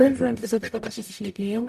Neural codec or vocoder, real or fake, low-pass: codec, 44.1 kHz, 0.9 kbps, DAC; fake; 14.4 kHz